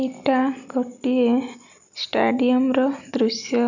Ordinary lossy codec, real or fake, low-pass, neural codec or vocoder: none; real; 7.2 kHz; none